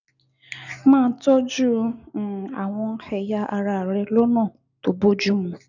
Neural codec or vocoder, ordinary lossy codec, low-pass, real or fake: none; none; 7.2 kHz; real